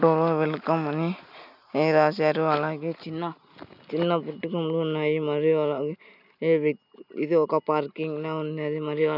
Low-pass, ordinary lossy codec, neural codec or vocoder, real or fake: 5.4 kHz; none; none; real